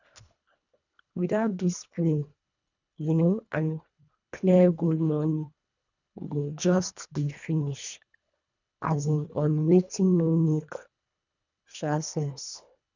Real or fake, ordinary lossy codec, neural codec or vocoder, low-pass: fake; none; codec, 24 kHz, 1.5 kbps, HILCodec; 7.2 kHz